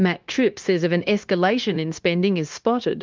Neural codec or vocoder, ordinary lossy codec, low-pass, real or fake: codec, 16 kHz, 0.9 kbps, LongCat-Audio-Codec; Opus, 32 kbps; 7.2 kHz; fake